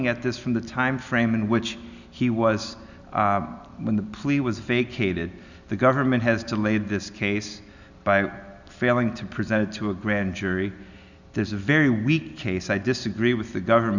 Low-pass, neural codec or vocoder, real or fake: 7.2 kHz; none; real